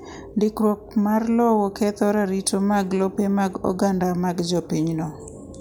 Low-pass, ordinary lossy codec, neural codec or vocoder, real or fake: none; none; none; real